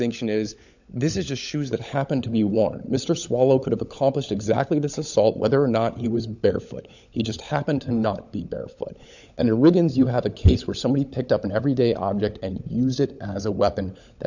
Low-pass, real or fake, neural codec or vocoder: 7.2 kHz; fake; codec, 16 kHz, 16 kbps, FunCodec, trained on LibriTTS, 50 frames a second